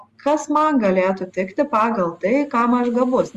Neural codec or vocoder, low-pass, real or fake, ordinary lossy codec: none; 14.4 kHz; real; Opus, 32 kbps